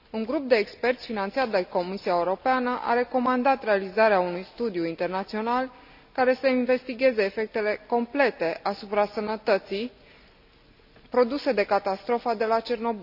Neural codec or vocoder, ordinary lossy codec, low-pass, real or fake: none; AAC, 48 kbps; 5.4 kHz; real